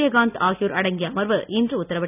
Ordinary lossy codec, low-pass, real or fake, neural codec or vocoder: none; 3.6 kHz; real; none